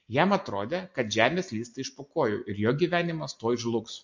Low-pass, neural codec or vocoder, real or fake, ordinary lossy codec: 7.2 kHz; none; real; MP3, 48 kbps